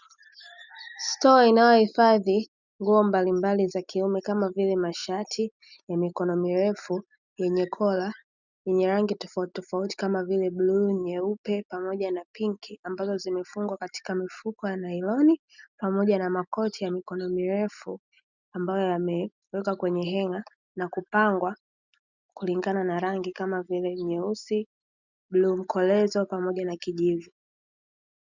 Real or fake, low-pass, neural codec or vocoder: real; 7.2 kHz; none